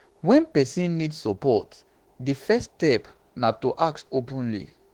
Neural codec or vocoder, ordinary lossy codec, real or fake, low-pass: autoencoder, 48 kHz, 32 numbers a frame, DAC-VAE, trained on Japanese speech; Opus, 16 kbps; fake; 19.8 kHz